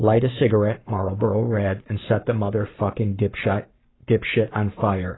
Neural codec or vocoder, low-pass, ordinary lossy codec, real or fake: codec, 44.1 kHz, 7.8 kbps, Pupu-Codec; 7.2 kHz; AAC, 16 kbps; fake